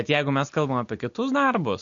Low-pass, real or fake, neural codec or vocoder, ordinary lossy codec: 7.2 kHz; real; none; MP3, 48 kbps